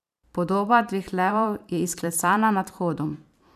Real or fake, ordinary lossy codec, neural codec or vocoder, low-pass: fake; none; vocoder, 44.1 kHz, 128 mel bands every 512 samples, BigVGAN v2; 14.4 kHz